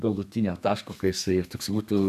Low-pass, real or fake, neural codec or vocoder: 14.4 kHz; fake; codec, 32 kHz, 1.9 kbps, SNAC